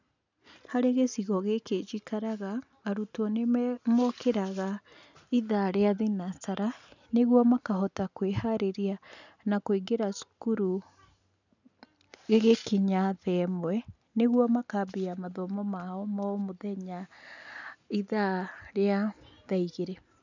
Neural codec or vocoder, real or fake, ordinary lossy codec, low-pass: none; real; none; 7.2 kHz